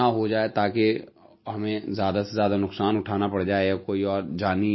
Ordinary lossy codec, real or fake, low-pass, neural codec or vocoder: MP3, 24 kbps; real; 7.2 kHz; none